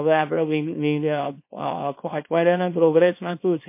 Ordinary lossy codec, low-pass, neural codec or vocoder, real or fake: MP3, 24 kbps; 3.6 kHz; codec, 24 kHz, 0.9 kbps, WavTokenizer, small release; fake